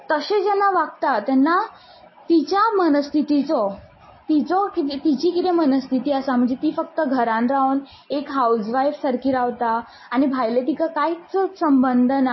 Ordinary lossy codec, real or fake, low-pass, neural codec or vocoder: MP3, 24 kbps; real; 7.2 kHz; none